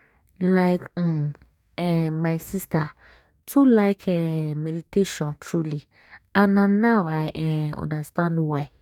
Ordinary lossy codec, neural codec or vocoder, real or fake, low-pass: none; codec, 44.1 kHz, 2.6 kbps, DAC; fake; 19.8 kHz